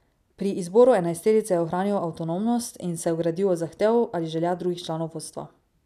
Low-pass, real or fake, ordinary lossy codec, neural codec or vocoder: 14.4 kHz; real; none; none